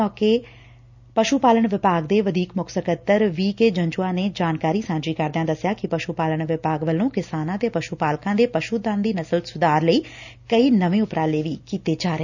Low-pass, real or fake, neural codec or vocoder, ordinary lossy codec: 7.2 kHz; real; none; none